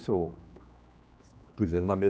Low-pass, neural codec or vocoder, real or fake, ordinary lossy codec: none; codec, 16 kHz, 2 kbps, X-Codec, HuBERT features, trained on general audio; fake; none